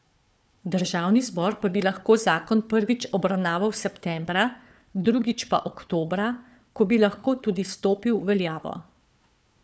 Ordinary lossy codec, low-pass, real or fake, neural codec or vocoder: none; none; fake; codec, 16 kHz, 4 kbps, FunCodec, trained on Chinese and English, 50 frames a second